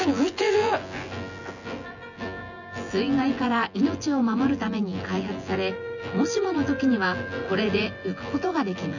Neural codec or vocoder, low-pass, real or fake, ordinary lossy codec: vocoder, 24 kHz, 100 mel bands, Vocos; 7.2 kHz; fake; none